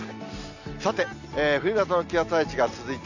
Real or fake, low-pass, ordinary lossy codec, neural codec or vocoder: real; 7.2 kHz; none; none